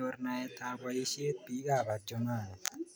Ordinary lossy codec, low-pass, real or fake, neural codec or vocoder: none; none; real; none